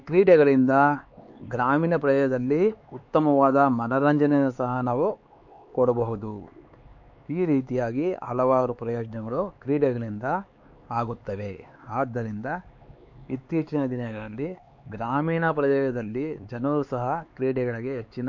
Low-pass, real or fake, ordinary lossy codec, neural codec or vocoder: 7.2 kHz; fake; MP3, 48 kbps; codec, 16 kHz, 4 kbps, X-Codec, HuBERT features, trained on LibriSpeech